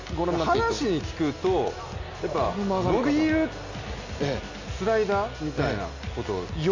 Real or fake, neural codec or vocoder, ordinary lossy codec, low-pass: real; none; AAC, 48 kbps; 7.2 kHz